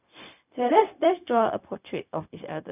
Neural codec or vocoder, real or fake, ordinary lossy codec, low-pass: codec, 16 kHz, 0.4 kbps, LongCat-Audio-Codec; fake; none; 3.6 kHz